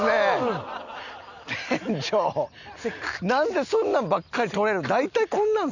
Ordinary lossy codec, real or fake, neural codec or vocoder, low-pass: none; real; none; 7.2 kHz